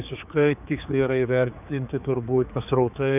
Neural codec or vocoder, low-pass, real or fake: codec, 16 kHz, 4 kbps, X-Codec, HuBERT features, trained on general audio; 3.6 kHz; fake